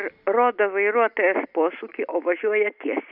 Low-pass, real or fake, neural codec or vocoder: 5.4 kHz; real; none